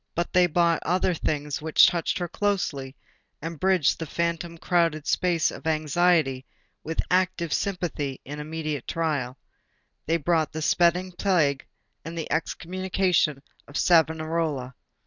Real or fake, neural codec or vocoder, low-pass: real; none; 7.2 kHz